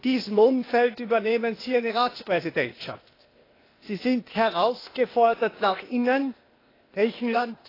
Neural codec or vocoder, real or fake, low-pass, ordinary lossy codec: codec, 16 kHz, 0.8 kbps, ZipCodec; fake; 5.4 kHz; AAC, 24 kbps